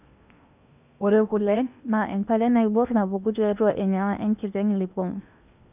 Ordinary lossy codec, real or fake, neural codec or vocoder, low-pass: none; fake; codec, 16 kHz in and 24 kHz out, 0.8 kbps, FocalCodec, streaming, 65536 codes; 3.6 kHz